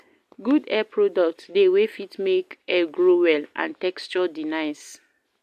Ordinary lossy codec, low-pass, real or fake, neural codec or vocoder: AAC, 96 kbps; 14.4 kHz; real; none